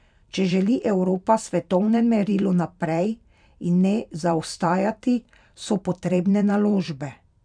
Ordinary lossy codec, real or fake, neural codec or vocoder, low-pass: none; fake; vocoder, 44.1 kHz, 128 mel bands every 512 samples, BigVGAN v2; 9.9 kHz